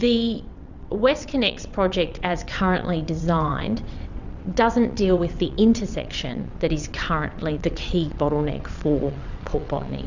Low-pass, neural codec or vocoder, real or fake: 7.2 kHz; vocoder, 22.05 kHz, 80 mel bands, WaveNeXt; fake